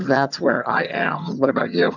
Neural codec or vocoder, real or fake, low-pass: vocoder, 22.05 kHz, 80 mel bands, HiFi-GAN; fake; 7.2 kHz